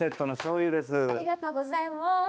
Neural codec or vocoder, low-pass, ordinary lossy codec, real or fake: codec, 16 kHz, 2 kbps, X-Codec, HuBERT features, trained on balanced general audio; none; none; fake